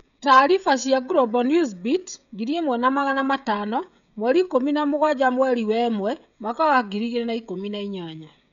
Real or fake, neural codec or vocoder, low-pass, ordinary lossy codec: fake; codec, 16 kHz, 16 kbps, FreqCodec, smaller model; 7.2 kHz; none